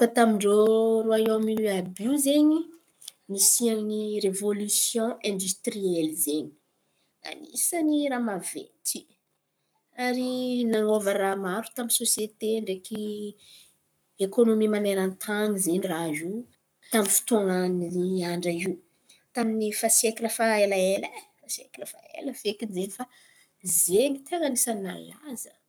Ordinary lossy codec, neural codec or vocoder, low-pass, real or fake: none; codec, 44.1 kHz, 7.8 kbps, Pupu-Codec; none; fake